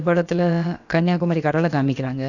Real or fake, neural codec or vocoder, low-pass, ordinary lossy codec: fake; codec, 16 kHz, about 1 kbps, DyCAST, with the encoder's durations; 7.2 kHz; none